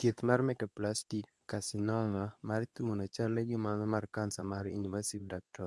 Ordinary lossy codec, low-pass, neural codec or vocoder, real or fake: none; none; codec, 24 kHz, 0.9 kbps, WavTokenizer, medium speech release version 2; fake